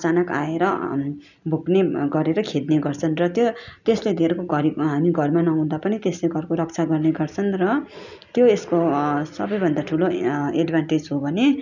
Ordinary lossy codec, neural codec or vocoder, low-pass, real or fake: none; none; 7.2 kHz; real